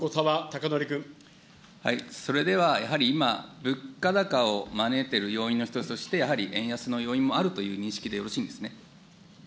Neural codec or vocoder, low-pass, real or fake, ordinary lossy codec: none; none; real; none